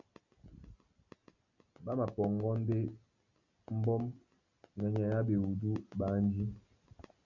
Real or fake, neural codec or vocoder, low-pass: real; none; 7.2 kHz